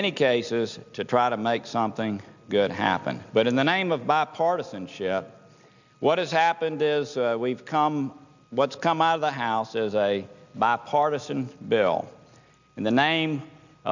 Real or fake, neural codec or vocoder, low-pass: real; none; 7.2 kHz